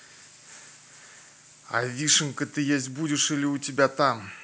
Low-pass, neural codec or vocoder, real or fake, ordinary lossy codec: none; none; real; none